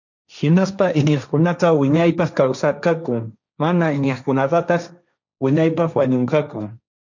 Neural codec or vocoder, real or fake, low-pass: codec, 16 kHz, 1.1 kbps, Voila-Tokenizer; fake; 7.2 kHz